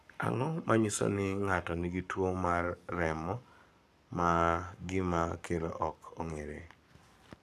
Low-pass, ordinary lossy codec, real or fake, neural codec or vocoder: 14.4 kHz; none; fake; codec, 44.1 kHz, 7.8 kbps, Pupu-Codec